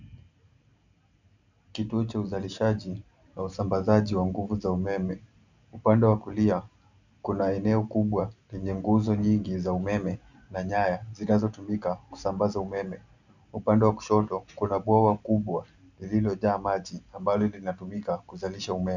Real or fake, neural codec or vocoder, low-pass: real; none; 7.2 kHz